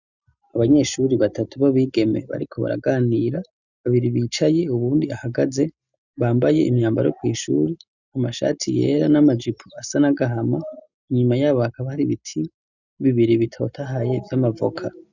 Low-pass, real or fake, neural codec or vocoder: 7.2 kHz; real; none